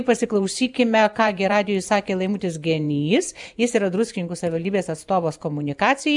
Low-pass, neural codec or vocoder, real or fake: 10.8 kHz; vocoder, 44.1 kHz, 128 mel bands every 512 samples, BigVGAN v2; fake